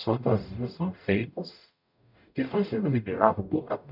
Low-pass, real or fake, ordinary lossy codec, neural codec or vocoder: 5.4 kHz; fake; none; codec, 44.1 kHz, 0.9 kbps, DAC